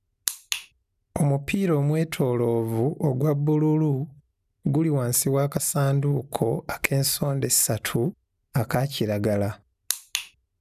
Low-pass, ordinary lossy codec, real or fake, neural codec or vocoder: 14.4 kHz; none; real; none